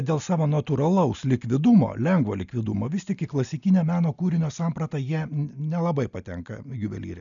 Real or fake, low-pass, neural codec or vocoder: real; 7.2 kHz; none